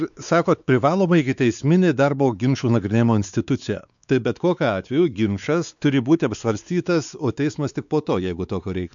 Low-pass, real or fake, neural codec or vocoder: 7.2 kHz; fake; codec, 16 kHz, 4 kbps, X-Codec, WavLM features, trained on Multilingual LibriSpeech